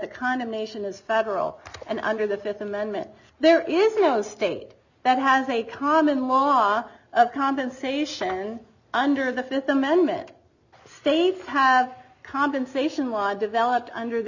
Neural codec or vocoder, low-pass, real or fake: none; 7.2 kHz; real